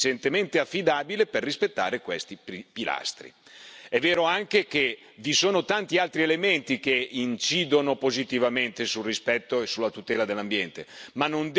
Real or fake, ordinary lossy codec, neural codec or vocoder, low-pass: real; none; none; none